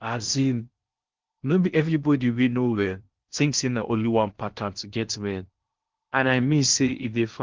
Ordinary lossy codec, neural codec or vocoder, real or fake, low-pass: Opus, 32 kbps; codec, 16 kHz in and 24 kHz out, 0.6 kbps, FocalCodec, streaming, 4096 codes; fake; 7.2 kHz